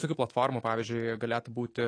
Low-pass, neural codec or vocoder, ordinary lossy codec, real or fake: 9.9 kHz; codec, 24 kHz, 3.1 kbps, DualCodec; AAC, 32 kbps; fake